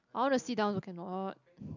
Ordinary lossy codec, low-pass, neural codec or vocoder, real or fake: none; 7.2 kHz; none; real